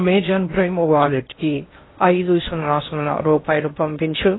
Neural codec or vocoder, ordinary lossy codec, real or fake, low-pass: codec, 16 kHz in and 24 kHz out, 0.6 kbps, FocalCodec, streaming, 2048 codes; AAC, 16 kbps; fake; 7.2 kHz